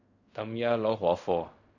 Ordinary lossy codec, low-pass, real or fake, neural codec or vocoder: none; 7.2 kHz; fake; codec, 16 kHz in and 24 kHz out, 0.4 kbps, LongCat-Audio-Codec, fine tuned four codebook decoder